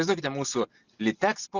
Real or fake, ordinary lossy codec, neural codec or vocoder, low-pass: real; Opus, 64 kbps; none; 7.2 kHz